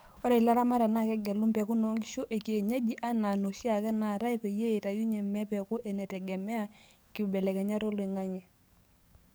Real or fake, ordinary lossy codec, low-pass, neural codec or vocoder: fake; none; none; codec, 44.1 kHz, 7.8 kbps, DAC